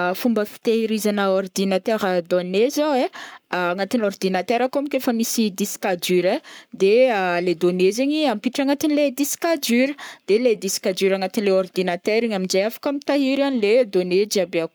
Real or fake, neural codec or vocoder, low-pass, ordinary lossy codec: fake; codec, 44.1 kHz, 7.8 kbps, Pupu-Codec; none; none